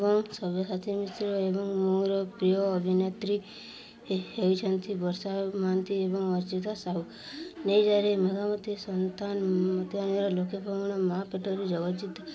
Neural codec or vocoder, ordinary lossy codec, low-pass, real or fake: none; none; none; real